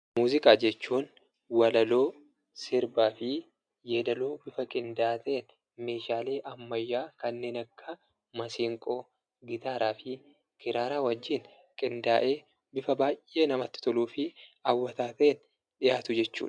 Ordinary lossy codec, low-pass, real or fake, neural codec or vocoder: MP3, 96 kbps; 9.9 kHz; real; none